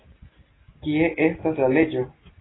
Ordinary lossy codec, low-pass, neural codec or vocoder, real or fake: AAC, 16 kbps; 7.2 kHz; none; real